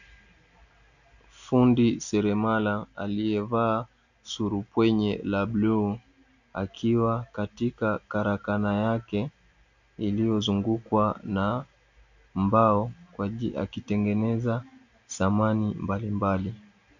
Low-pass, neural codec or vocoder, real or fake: 7.2 kHz; none; real